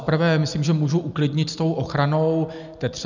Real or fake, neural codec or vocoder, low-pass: real; none; 7.2 kHz